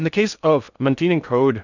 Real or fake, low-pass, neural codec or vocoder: fake; 7.2 kHz; codec, 16 kHz in and 24 kHz out, 0.6 kbps, FocalCodec, streaming, 2048 codes